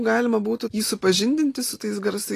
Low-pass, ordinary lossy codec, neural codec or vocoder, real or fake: 14.4 kHz; AAC, 48 kbps; none; real